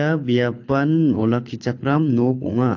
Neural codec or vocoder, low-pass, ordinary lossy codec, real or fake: codec, 24 kHz, 6 kbps, HILCodec; 7.2 kHz; AAC, 48 kbps; fake